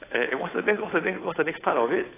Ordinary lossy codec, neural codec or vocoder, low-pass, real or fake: AAC, 16 kbps; codec, 16 kHz, 8 kbps, FunCodec, trained on Chinese and English, 25 frames a second; 3.6 kHz; fake